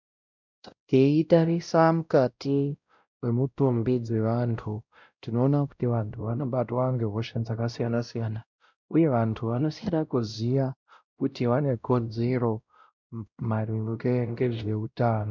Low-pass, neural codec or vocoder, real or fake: 7.2 kHz; codec, 16 kHz, 0.5 kbps, X-Codec, WavLM features, trained on Multilingual LibriSpeech; fake